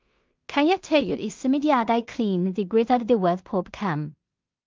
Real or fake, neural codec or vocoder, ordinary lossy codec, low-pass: fake; codec, 16 kHz in and 24 kHz out, 0.4 kbps, LongCat-Audio-Codec, two codebook decoder; Opus, 24 kbps; 7.2 kHz